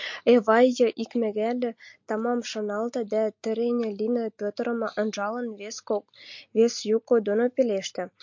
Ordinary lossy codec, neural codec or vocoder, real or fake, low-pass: MP3, 48 kbps; none; real; 7.2 kHz